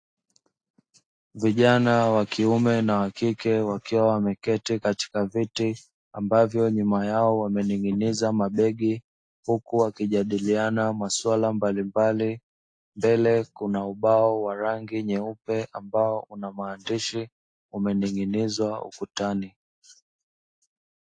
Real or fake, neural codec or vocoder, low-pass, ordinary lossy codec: real; none; 9.9 kHz; AAC, 48 kbps